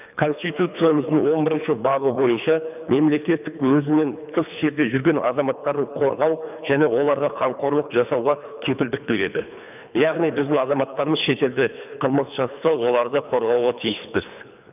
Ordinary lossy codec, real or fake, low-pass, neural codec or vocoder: none; fake; 3.6 kHz; codec, 24 kHz, 3 kbps, HILCodec